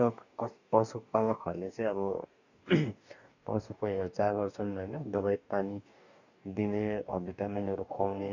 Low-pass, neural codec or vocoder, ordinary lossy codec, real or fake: 7.2 kHz; codec, 44.1 kHz, 2.6 kbps, DAC; none; fake